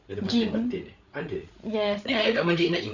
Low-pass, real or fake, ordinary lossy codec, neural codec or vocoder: 7.2 kHz; fake; none; codec, 16 kHz, 8 kbps, FreqCodec, larger model